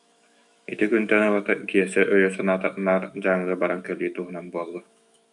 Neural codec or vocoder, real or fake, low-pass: autoencoder, 48 kHz, 128 numbers a frame, DAC-VAE, trained on Japanese speech; fake; 10.8 kHz